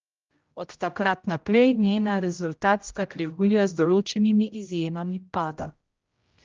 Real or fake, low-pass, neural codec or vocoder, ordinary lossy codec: fake; 7.2 kHz; codec, 16 kHz, 0.5 kbps, X-Codec, HuBERT features, trained on general audio; Opus, 24 kbps